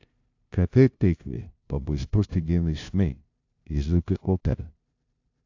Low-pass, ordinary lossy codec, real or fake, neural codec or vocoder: 7.2 kHz; AAC, 48 kbps; fake; codec, 16 kHz, 0.5 kbps, FunCodec, trained on LibriTTS, 25 frames a second